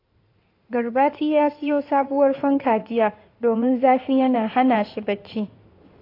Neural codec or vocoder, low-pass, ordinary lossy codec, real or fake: codec, 16 kHz in and 24 kHz out, 2.2 kbps, FireRedTTS-2 codec; 5.4 kHz; AAC, 32 kbps; fake